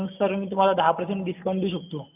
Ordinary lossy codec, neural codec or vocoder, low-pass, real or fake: none; none; 3.6 kHz; real